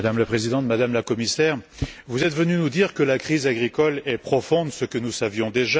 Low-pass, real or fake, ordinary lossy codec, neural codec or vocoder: none; real; none; none